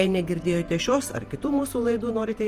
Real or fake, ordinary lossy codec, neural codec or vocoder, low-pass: fake; Opus, 24 kbps; vocoder, 48 kHz, 128 mel bands, Vocos; 14.4 kHz